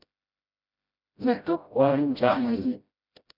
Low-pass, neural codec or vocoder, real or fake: 5.4 kHz; codec, 16 kHz, 0.5 kbps, FreqCodec, smaller model; fake